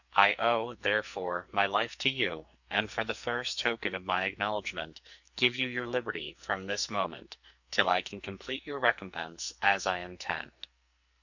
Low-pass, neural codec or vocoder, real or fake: 7.2 kHz; codec, 44.1 kHz, 2.6 kbps, SNAC; fake